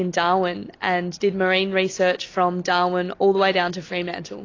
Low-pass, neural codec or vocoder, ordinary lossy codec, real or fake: 7.2 kHz; none; AAC, 32 kbps; real